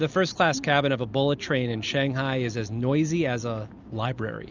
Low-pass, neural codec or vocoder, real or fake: 7.2 kHz; none; real